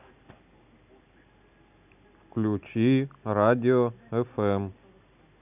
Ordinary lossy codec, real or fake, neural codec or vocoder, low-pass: none; real; none; 3.6 kHz